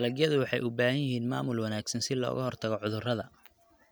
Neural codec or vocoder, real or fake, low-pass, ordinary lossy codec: none; real; none; none